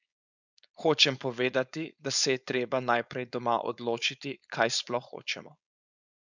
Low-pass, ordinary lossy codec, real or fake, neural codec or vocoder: 7.2 kHz; none; fake; codec, 16 kHz, 4.8 kbps, FACodec